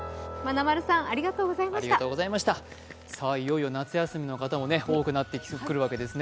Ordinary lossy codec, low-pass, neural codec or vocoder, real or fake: none; none; none; real